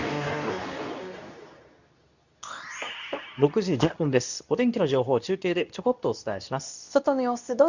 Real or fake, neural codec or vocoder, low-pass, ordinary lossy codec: fake; codec, 24 kHz, 0.9 kbps, WavTokenizer, medium speech release version 2; 7.2 kHz; none